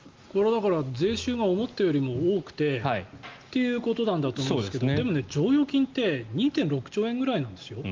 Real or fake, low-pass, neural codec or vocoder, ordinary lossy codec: real; 7.2 kHz; none; Opus, 32 kbps